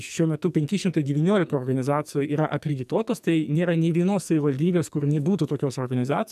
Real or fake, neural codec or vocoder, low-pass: fake; codec, 44.1 kHz, 2.6 kbps, SNAC; 14.4 kHz